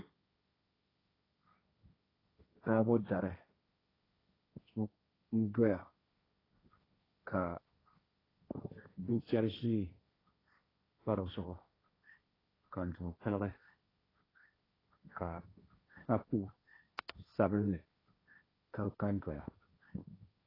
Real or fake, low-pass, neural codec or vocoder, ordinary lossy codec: fake; 5.4 kHz; codec, 16 kHz, 1.1 kbps, Voila-Tokenizer; AAC, 24 kbps